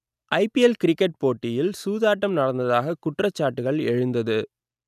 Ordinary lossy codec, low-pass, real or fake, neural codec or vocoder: none; 14.4 kHz; real; none